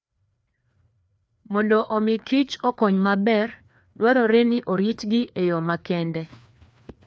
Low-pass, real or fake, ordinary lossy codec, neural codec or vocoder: none; fake; none; codec, 16 kHz, 2 kbps, FreqCodec, larger model